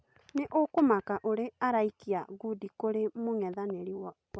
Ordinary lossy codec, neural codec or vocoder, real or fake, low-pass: none; none; real; none